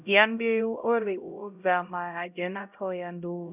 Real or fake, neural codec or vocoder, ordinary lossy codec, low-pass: fake; codec, 16 kHz, 0.5 kbps, X-Codec, HuBERT features, trained on LibriSpeech; none; 3.6 kHz